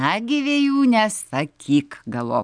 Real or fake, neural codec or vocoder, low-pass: real; none; 9.9 kHz